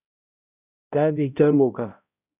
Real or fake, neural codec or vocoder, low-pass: fake; codec, 16 kHz, 0.5 kbps, X-Codec, HuBERT features, trained on balanced general audio; 3.6 kHz